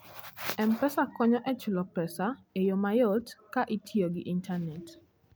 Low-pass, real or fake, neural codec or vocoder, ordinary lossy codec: none; real; none; none